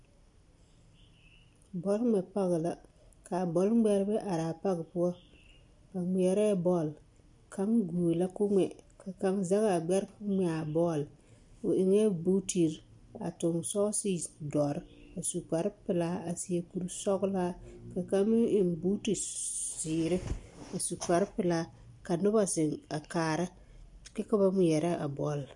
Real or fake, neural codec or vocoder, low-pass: real; none; 10.8 kHz